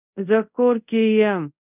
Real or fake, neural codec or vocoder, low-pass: fake; codec, 24 kHz, 0.5 kbps, DualCodec; 3.6 kHz